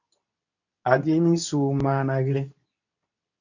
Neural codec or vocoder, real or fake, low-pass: codec, 24 kHz, 0.9 kbps, WavTokenizer, medium speech release version 2; fake; 7.2 kHz